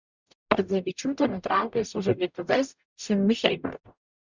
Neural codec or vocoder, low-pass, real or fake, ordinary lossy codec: codec, 44.1 kHz, 0.9 kbps, DAC; 7.2 kHz; fake; Opus, 64 kbps